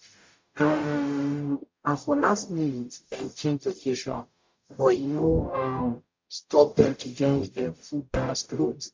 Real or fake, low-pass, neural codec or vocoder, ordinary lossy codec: fake; 7.2 kHz; codec, 44.1 kHz, 0.9 kbps, DAC; MP3, 48 kbps